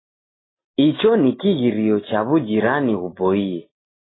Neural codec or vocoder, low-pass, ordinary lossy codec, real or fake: none; 7.2 kHz; AAC, 16 kbps; real